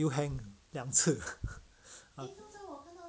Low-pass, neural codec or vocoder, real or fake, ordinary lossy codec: none; none; real; none